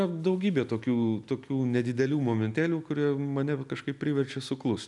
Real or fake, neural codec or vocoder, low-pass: real; none; 10.8 kHz